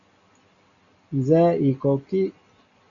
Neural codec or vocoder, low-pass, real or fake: none; 7.2 kHz; real